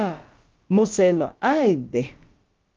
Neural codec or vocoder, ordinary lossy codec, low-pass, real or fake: codec, 16 kHz, about 1 kbps, DyCAST, with the encoder's durations; Opus, 24 kbps; 7.2 kHz; fake